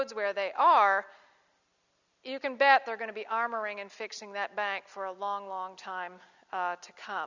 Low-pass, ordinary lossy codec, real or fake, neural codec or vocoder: 7.2 kHz; Opus, 64 kbps; real; none